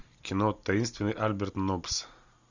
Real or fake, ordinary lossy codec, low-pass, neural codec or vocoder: real; Opus, 64 kbps; 7.2 kHz; none